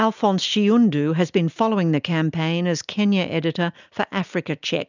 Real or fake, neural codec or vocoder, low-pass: real; none; 7.2 kHz